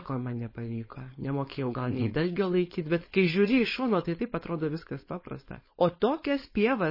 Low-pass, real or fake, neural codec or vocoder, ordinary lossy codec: 5.4 kHz; fake; codec, 16 kHz, 4.8 kbps, FACodec; MP3, 24 kbps